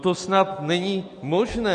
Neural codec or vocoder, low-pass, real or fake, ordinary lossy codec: none; 9.9 kHz; real; MP3, 48 kbps